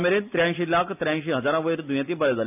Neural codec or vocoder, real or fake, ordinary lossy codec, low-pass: none; real; none; 3.6 kHz